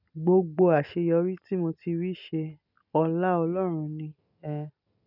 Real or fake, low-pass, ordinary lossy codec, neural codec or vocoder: real; 5.4 kHz; none; none